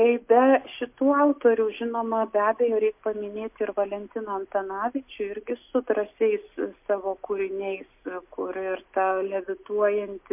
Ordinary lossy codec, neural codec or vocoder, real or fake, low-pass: MP3, 32 kbps; none; real; 3.6 kHz